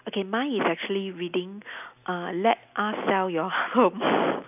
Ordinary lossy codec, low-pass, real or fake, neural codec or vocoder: none; 3.6 kHz; real; none